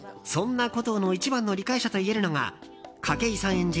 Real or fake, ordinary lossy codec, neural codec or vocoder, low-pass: real; none; none; none